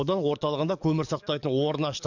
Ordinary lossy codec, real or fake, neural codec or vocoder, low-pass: none; fake; codec, 44.1 kHz, 7.8 kbps, DAC; 7.2 kHz